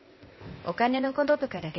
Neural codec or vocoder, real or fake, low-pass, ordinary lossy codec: codec, 16 kHz, 0.8 kbps, ZipCodec; fake; 7.2 kHz; MP3, 24 kbps